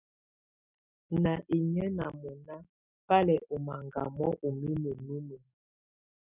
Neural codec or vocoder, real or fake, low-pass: none; real; 3.6 kHz